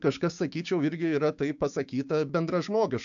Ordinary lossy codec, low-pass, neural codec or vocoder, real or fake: AAC, 64 kbps; 7.2 kHz; codec, 16 kHz, 6 kbps, DAC; fake